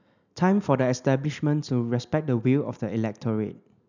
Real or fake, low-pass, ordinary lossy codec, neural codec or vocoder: real; 7.2 kHz; none; none